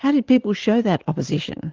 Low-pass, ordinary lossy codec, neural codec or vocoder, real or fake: 7.2 kHz; Opus, 16 kbps; none; real